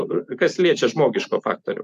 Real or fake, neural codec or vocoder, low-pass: real; none; 14.4 kHz